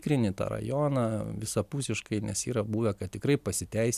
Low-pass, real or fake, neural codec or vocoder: 14.4 kHz; real; none